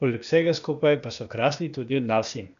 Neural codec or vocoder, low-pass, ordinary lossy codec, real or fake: codec, 16 kHz, 0.8 kbps, ZipCodec; 7.2 kHz; none; fake